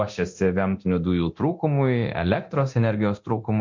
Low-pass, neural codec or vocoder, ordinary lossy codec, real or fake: 7.2 kHz; codec, 24 kHz, 0.9 kbps, DualCodec; MP3, 48 kbps; fake